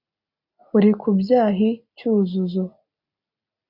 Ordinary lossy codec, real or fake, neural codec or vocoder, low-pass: AAC, 48 kbps; real; none; 5.4 kHz